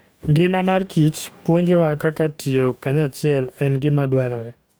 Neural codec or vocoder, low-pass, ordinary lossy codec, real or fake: codec, 44.1 kHz, 2.6 kbps, DAC; none; none; fake